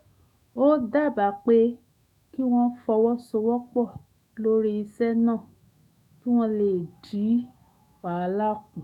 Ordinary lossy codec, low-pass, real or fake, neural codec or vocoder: none; 19.8 kHz; fake; autoencoder, 48 kHz, 128 numbers a frame, DAC-VAE, trained on Japanese speech